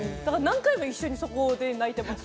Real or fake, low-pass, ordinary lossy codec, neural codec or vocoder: real; none; none; none